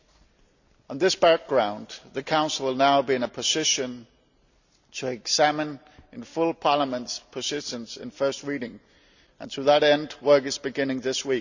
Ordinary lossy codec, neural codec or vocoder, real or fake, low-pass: none; none; real; 7.2 kHz